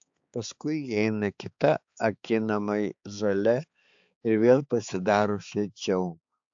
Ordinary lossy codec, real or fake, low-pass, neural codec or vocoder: AAC, 64 kbps; fake; 7.2 kHz; codec, 16 kHz, 4 kbps, X-Codec, HuBERT features, trained on balanced general audio